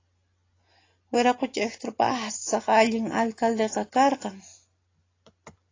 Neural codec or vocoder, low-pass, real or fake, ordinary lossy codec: none; 7.2 kHz; real; AAC, 32 kbps